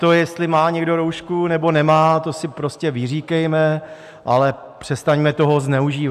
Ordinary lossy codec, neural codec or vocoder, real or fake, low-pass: AAC, 96 kbps; none; real; 14.4 kHz